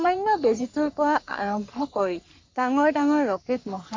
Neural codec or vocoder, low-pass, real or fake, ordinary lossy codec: codec, 44.1 kHz, 3.4 kbps, Pupu-Codec; 7.2 kHz; fake; MP3, 48 kbps